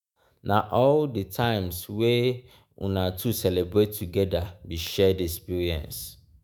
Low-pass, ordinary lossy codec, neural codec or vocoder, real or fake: none; none; autoencoder, 48 kHz, 128 numbers a frame, DAC-VAE, trained on Japanese speech; fake